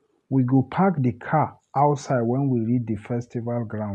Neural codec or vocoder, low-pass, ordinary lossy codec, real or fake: none; none; none; real